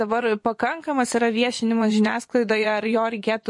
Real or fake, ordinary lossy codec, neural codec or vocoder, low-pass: fake; MP3, 48 kbps; vocoder, 24 kHz, 100 mel bands, Vocos; 10.8 kHz